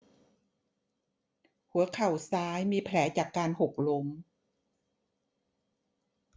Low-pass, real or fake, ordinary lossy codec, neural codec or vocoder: none; real; none; none